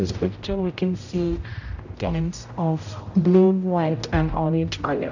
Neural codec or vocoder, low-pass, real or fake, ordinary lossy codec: codec, 16 kHz, 0.5 kbps, X-Codec, HuBERT features, trained on general audio; 7.2 kHz; fake; none